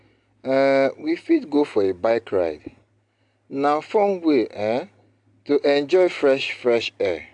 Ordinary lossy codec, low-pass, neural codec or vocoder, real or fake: AAC, 64 kbps; 9.9 kHz; none; real